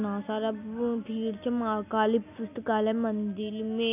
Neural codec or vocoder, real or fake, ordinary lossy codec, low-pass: none; real; none; 3.6 kHz